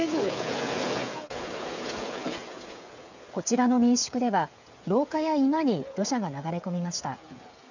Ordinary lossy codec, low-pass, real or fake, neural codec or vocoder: none; 7.2 kHz; fake; codec, 16 kHz, 8 kbps, FreqCodec, smaller model